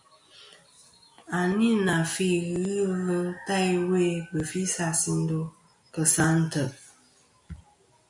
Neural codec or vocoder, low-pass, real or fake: none; 10.8 kHz; real